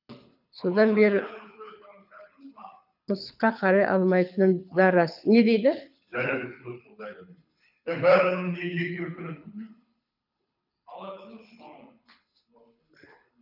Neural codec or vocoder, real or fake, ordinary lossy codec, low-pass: codec, 24 kHz, 6 kbps, HILCodec; fake; none; 5.4 kHz